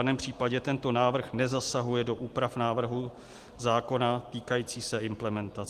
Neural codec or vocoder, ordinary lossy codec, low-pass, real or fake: none; Opus, 24 kbps; 14.4 kHz; real